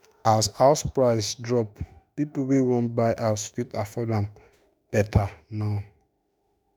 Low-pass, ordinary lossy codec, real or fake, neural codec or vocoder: none; none; fake; autoencoder, 48 kHz, 32 numbers a frame, DAC-VAE, trained on Japanese speech